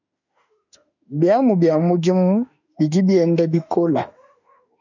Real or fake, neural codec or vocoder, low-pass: fake; autoencoder, 48 kHz, 32 numbers a frame, DAC-VAE, trained on Japanese speech; 7.2 kHz